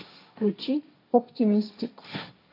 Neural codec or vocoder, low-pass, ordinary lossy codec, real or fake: codec, 16 kHz, 1.1 kbps, Voila-Tokenizer; 5.4 kHz; AAC, 32 kbps; fake